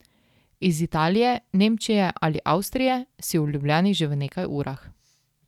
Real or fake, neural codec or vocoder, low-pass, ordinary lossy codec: real; none; 19.8 kHz; none